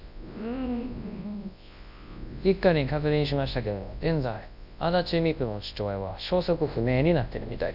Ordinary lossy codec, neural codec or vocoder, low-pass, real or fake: none; codec, 24 kHz, 0.9 kbps, WavTokenizer, large speech release; 5.4 kHz; fake